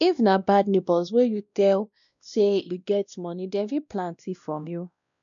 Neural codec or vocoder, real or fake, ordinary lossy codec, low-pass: codec, 16 kHz, 1 kbps, X-Codec, WavLM features, trained on Multilingual LibriSpeech; fake; none; 7.2 kHz